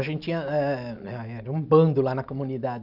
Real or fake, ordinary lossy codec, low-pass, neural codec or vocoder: real; none; 5.4 kHz; none